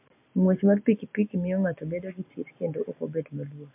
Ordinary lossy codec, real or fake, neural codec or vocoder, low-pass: MP3, 32 kbps; real; none; 3.6 kHz